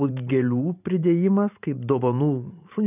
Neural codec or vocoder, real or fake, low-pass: vocoder, 44.1 kHz, 128 mel bands every 512 samples, BigVGAN v2; fake; 3.6 kHz